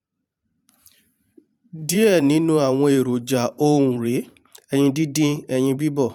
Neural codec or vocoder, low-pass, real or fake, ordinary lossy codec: vocoder, 44.1 kHz, 128 mel bands every 512 samples, BigVGAN v2; 19.8 kHz; fake; none